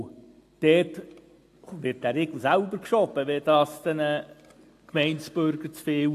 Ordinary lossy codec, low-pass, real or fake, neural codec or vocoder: AAC, 64 kbps; 14.4 kHz; real; none